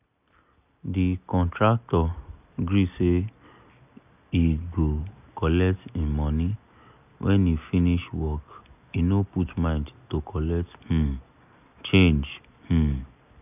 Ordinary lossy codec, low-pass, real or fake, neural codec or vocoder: none; 3.6 kHz; real; none